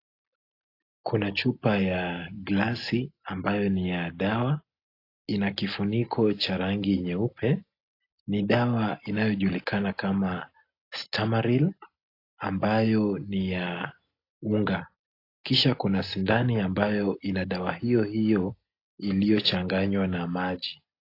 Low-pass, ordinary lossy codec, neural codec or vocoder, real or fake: 5.4 kHz; AAC, 32 kbps; none; real